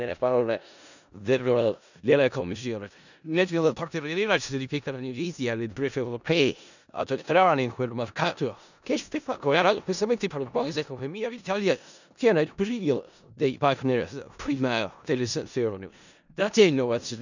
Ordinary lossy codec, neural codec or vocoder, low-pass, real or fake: none; codec, 16 kHz in and 24 kHz out, 0.4 kbps, LongCat-Audio-Codec, four codebook decoder; 7.2 kHz; fake